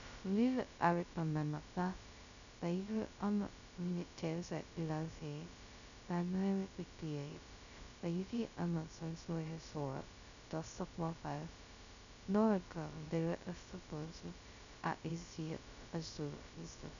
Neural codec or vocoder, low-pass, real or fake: codec, 16 kHz, 0.2 kbps, FocalCodec; 7.2 kHz; fake